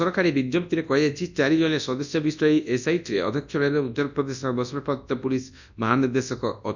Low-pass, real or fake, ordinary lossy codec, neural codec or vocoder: 7.2 kHz; fake; none; codec, 24 kHz, 0.9 kbps, WavTokenizer, large speech release